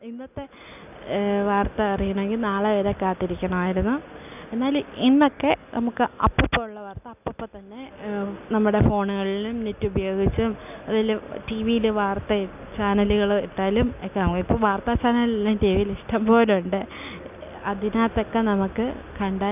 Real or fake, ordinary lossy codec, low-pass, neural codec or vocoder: real; none; 3.6 kHz; none